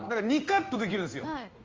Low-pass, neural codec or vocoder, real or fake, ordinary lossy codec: 7.2 kHz; codec, 16 kHz in and 24 kHz out, 1 kbps, XY-Tokenizer; fake; Opus, 32 kbps